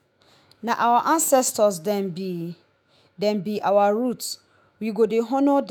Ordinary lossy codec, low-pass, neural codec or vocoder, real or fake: none; 19.8 kHz; autoencoder, 48 kHz, 128 numbers a frame, DAC-VAE, trained on Japanese speech; fake